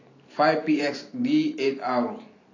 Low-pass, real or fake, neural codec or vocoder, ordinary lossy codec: 7.2 kHz; fake; vocoder, 44.1 kHz, 128 mel bands every 512 samples, BigVGAN v2; AAC, 32 kbps